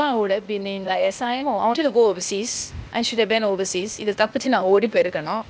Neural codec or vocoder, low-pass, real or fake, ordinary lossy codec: codec, 16 kHz, 0.8 kbps, ZipCodec; none; fake; none